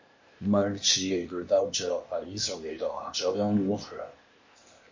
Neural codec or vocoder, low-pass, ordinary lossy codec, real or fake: codec, 16 kHz, 0.8 kbps, ZipCodec; 7.2 kHz; MP3, 32 kbps; fake